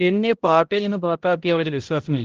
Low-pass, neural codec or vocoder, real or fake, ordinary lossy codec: 7.2 kHz; codec, 16 kHz, 0.5 kbps, X-Codec, HuBERT features, trained on balanced general audio; fake; Opus, 16 kbps